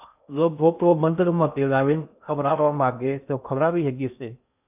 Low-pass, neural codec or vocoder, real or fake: 3.6 kHz; codec, 16 kHz in and 24 kHz out, 0.6 kbps, FocalCodec, streaming, 2048 codes; fake